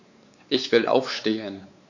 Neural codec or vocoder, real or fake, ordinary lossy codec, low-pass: codec, 16 kHz, 4 kbps, X-Codec, WavLM features, trained on Multilingual LibriSpeech; fake; none; 7.2 kHz